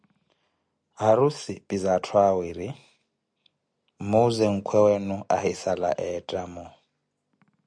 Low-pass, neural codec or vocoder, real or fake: 9.9 kHz; none; real